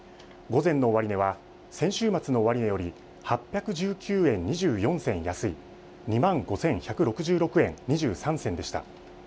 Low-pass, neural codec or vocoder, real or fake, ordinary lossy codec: none; none; real; none